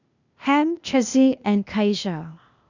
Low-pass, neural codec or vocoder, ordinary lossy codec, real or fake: 7.2 kHz; codec, 16 kHz, 0.8 kbps, ZipCodec; none; fake